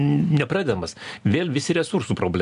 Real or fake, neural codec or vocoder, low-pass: real; none; 10.8 kHz